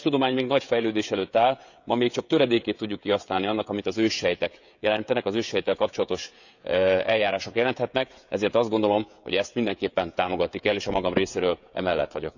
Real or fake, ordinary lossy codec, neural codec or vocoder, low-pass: fake; none; codec, 16 kHz, 16 kbps, FreqCodec, smaller model; 7.2 kHz